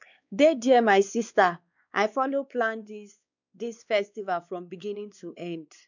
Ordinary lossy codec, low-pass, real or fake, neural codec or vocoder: none; 7.2 kHz; fake; codec, 16 kHz, 2 kbps, X-Codec, WavLM features, trained on Multilingual LibriSpeech